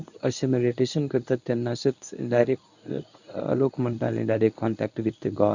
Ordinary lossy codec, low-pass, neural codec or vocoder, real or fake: none; 7.2 kHz; codec, 24 kHz, 0.9 kbps, WavTokenizer, medium speech release version 1; fake